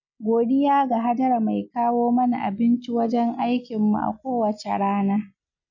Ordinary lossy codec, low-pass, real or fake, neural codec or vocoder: none; 7.2 kHz; real; none